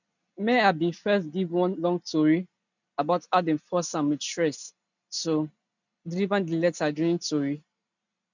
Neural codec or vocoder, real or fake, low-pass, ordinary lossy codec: none; real; 7.2 kHz; none